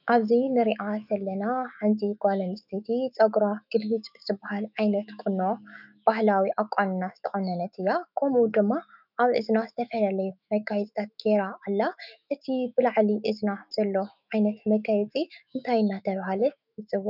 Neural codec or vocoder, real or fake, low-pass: autoencoder, 48 kHz, 128 numbers a frame, DAC-VAE, trained on Japanese speech; fake; 5.4 kHz